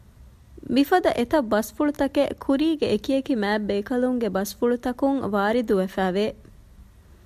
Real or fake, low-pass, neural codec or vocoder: real; 14.4 kHz; none